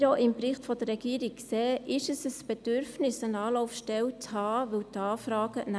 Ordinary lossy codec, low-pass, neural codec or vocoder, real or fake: none; none; none; real